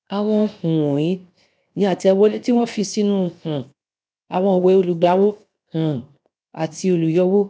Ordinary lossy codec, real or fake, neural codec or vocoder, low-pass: none; fake; codec, 16 kHz, 0.7 kbps, FocalCodec; none